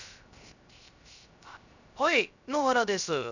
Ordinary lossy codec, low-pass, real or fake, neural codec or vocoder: none; 7.2 kHz; fake; codec, 16 kHz, 0.3 kbps, FocalCodec